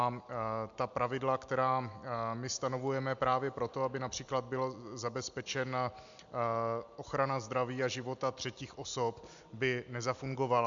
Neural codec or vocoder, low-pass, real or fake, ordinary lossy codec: none; 7.2 kHz; real; MP3, 64 kbps